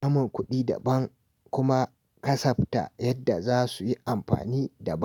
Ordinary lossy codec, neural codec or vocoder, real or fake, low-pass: none; none; real; 19.8 kHz